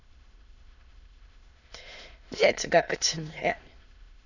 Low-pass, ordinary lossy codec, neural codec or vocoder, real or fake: 7.2 kHz; none; autoencoder, 22.05 kHz, a latent of 192 numbers a frame, VITS, trained on many speakers; fake